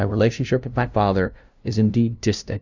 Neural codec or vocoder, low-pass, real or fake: codec, 16 kHz, 0.5 kbps, FunCodec, trained on LibriTTS, 25 frames a second; 7.2 kHz; fake